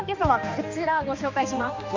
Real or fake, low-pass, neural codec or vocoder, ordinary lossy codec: fake; 7.2 kHz; codec, 16 kHz, 2 kbps, X-Codec, HuBERT features, trained on balanced general audio; MP3, 48 kbps